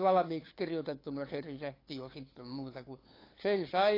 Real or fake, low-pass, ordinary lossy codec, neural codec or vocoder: fake; 5.4 kHz; AAC, 24 kbps; codec, 16 kHz, 8 kbps, FunCodec, trained on LibriTTS, 25 frames a second